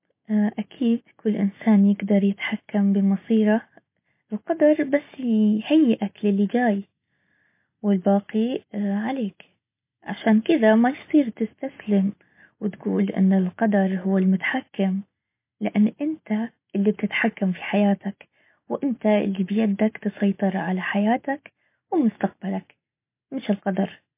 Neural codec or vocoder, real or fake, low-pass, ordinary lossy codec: none; real; 3.6 kHz; MP3, 24 kbps